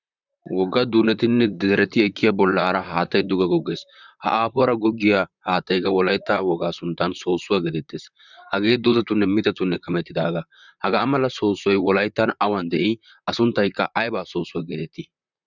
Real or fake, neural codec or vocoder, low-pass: fake; vocoder, 44.1 kHz, 128 mel bands, Pupu-Vocoder; 7.2 kHz